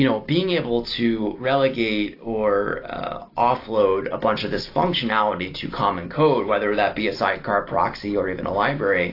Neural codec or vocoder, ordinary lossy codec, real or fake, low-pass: none; AAC, 32 kbps; real; 5.4 kHz